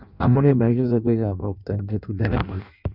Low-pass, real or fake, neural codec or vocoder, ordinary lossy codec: 5.4 kHz; fake; codec, 16 kHz in and 24 kHz out, 0.6 kbps, FireRedTTS-2 codec; none